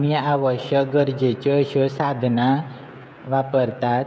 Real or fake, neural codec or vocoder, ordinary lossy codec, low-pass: fake; codec, 16 kHz, 16 kbps, FreqCodec, smaller model; none; none